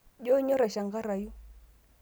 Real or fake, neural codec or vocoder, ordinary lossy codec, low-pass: real; none; none; none